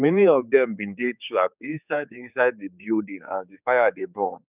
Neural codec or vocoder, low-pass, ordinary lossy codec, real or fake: codec, 16 kHz, 4 kbps, X-Codec, HuBERT features, trained on general audio; 3.6 kHz; none; fake